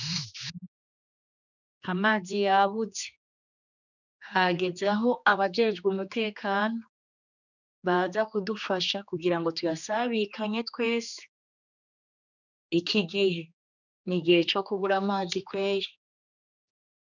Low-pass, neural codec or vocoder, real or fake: 7.2 kHz; codec, 16 kHz, 2 kbps, X-Codec, HuBERT features, trained on general audio; fake